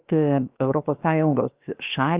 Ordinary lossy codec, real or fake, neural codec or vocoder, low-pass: Opus, 32 kbps; fake; codec, 16 kHz, 0.7 kbps, FocalCodec; 3.6 kHz